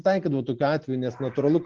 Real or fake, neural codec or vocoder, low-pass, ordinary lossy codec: real; none; 7.2 kHz; Opus, 16 kbps